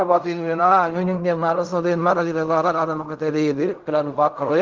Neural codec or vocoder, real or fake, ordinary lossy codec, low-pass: codec, 16 kHz in and 24 kHz out, 0.4 kbps, LongCat-Audio-Codec, fine tuned four codebook decoder; fake; Opus, 24 kbps; 7.2 kHz